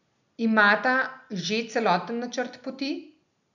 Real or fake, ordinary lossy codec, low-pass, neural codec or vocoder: real; none; 7.2 kHz; none